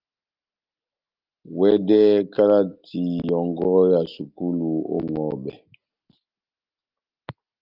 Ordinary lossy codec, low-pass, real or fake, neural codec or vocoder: Opus, 32 kbps; 5.4 kHz; real; none